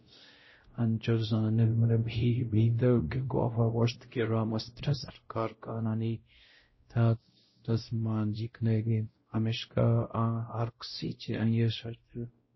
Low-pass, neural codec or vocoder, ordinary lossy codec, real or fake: 7.2 kHz; codec, 16 kHz, 0.5 kbps, X-Codec, WavLM features, trained on Multilingual LibriSpeech; MP3, 24 kbps; fake